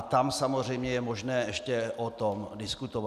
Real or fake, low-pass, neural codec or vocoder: real; 14.4 kHz; none